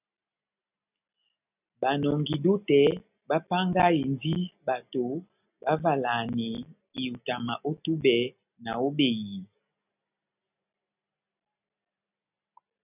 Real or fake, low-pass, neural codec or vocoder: real; 3.6 kHz; none